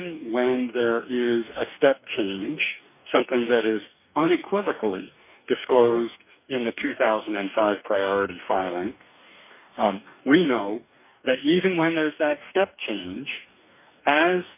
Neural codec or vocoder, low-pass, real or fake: codec, 44.1 kHz, 2.6 kbps, DAC; 3.6 kHz; fake